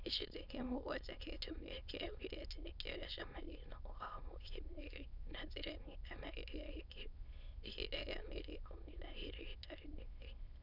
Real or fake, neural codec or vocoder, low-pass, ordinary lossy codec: fake; autoencoder, 22.05 kHz, a latent of 192 numbers a frame, VITS, trained on many speakers; 5.4 kHz; none